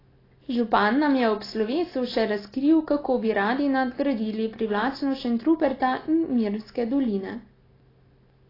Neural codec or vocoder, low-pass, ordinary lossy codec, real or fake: none; 5.4 kHz; AAC, 24 kbps; real